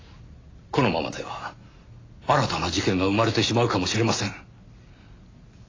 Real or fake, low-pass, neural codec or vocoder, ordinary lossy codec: real; 7.2 kHz; none; AAC, 32 kbps